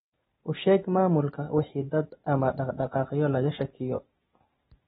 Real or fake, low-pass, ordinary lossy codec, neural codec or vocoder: real; 19.8 kHz; AAC, 16 kbps; none